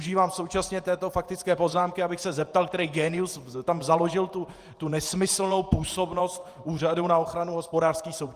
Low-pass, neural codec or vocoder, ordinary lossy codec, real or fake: 14.4 kHz; vocoder, 48 kHz, 128 mel bands, Vocos; Opus, 32 kbps; fake